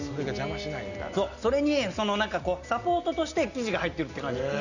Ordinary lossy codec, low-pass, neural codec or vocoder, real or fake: none; 7.2 kHz; none; real